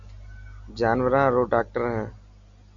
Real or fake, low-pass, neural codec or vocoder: real; 7.2 kHz; none